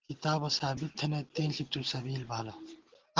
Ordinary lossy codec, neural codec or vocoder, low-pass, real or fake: Opus, 16 kbps; none; 7.2 kHz; real